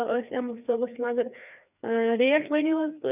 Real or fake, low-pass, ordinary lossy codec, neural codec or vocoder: fake; 3.6 kHz; none; codec, 16 kHz, 2 kbps, FreqCodec, larger model